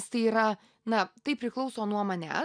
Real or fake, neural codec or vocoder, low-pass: real; none; 9.9 kHz